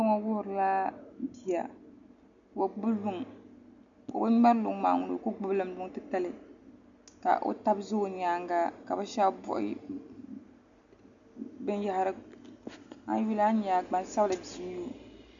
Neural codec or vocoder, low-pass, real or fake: none; 7.2 kHz; real